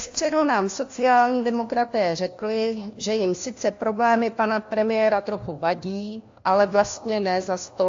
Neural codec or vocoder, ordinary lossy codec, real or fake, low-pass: codec, 16 kHz, 1 kbps, FunCodec, trained on LibriTTS, 50 frames a second; AAC, 48 kbps; fake; 7.2 kHz